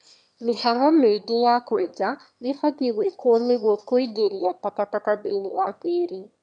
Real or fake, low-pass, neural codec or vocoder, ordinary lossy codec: fake; 9.9 kHz; autoencoder, 22.05 kHz, a latent of 192 numbers a frame, VITS, trained on one speaker; none